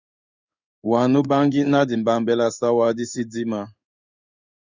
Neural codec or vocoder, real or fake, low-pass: codec, 16 kHz in and 24 kHz out, 1 kbps, XY-Tokenizer; fake; 7.2 kHz